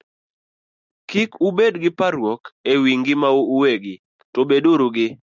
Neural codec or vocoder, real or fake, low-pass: none; real; 7.2 kHz